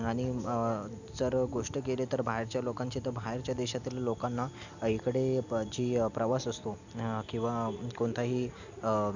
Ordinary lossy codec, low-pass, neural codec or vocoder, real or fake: none; 7.2 kHz; none; real